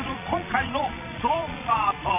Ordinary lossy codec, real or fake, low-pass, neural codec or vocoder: MP3, 24 kbps; fake; 3.6 kHz; vocoder, 22.05 kHz, 80 mel bands, Vocos